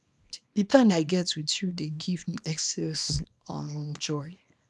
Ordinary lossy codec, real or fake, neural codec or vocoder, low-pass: none; fake; codec, 24 kHz, 0.9 kbps, WavTokenizer, small release; none